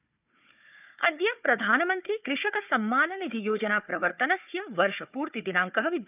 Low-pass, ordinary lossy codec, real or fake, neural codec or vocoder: 3.6 kHz; none; fake; codec, 16 kHz, 4 kbps, FunCodec, trained on Chinese and English, 50 frames a second